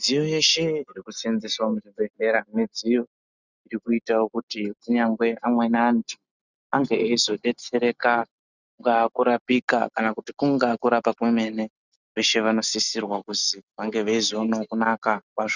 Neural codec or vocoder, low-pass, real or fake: none; 7.2 kHz; real